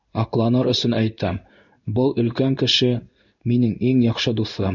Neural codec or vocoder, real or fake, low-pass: codec, 16 kHz in and 24 kHz out, 1 kbps, XY-Tokenizer; fake; 7.2 kHz